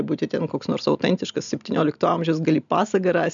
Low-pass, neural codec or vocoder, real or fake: 7.2 kHz; none; real